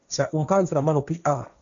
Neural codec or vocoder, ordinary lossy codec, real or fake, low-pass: codec, 16 kHz, 1.1 kbps, Voila-Tokenizer; MP3, 64 kbps; fake; 7.2 kHz